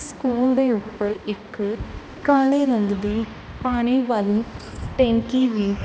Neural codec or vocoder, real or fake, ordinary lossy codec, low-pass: codec, 16 kHz, 1 kbps, X-Codec, HuBERT features, trained on balanced general audio; fake; none; none